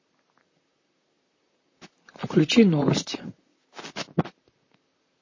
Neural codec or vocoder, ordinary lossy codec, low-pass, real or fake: vocoder, 44.1 kHz, 128 mel bands, Pupu-Vocoder; MP3, 32 kbps; 7.2 kHz; fake